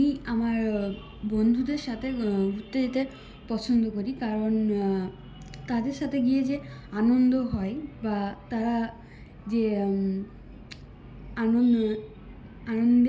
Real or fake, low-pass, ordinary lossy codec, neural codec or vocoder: real; none; none; none